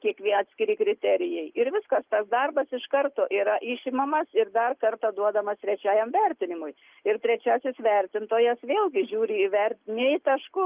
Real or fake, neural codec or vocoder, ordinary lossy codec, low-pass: real; none; Opus, 32 kbps; 3.6 kHz